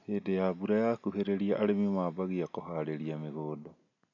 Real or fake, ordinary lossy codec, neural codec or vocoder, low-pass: real; none; none; 7.2 kHz